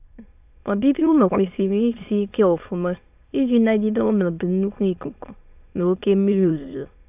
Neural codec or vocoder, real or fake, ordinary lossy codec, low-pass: autoencoder, 22.05 kHz, a latent of 192 numbers a frame, VITS, trained on many speakers; fake; AAC, 32 kbps; 3.6 kHz